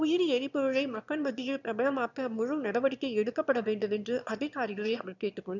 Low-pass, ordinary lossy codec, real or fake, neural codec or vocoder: 7.2 kHz; none; fake; autoencoder, 22.05 kHz, a latent of 192 numbers a frame, VITS, trained on one speaker